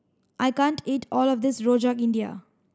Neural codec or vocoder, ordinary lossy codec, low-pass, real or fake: none; none; none; real